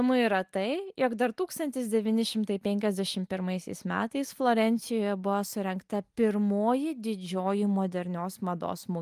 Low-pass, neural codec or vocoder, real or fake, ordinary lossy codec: 14.4 kHz; none; real; Opus, 32 kbps